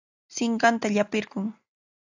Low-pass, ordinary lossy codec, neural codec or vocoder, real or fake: 7.2 kHz; AAC, 48 kbps; none; real